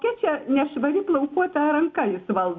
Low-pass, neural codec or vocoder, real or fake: 7.2 kHz; none; real